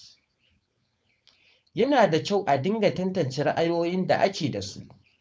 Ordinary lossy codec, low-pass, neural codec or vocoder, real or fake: none; none; codec, 16 kHz, 4.8 kbps, FACodec; fake